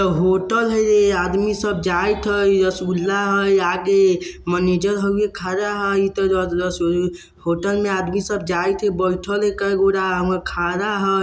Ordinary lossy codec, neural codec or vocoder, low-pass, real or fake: none; none; none; real